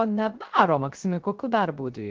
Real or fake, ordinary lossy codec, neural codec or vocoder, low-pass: fake; Opus, 16 kbps; codec, 16 kHz, 0.3 kbps, FocalCodec; 7.2 kHz